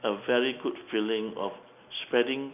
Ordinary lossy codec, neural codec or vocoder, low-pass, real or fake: none; none; 3.6 kHz; real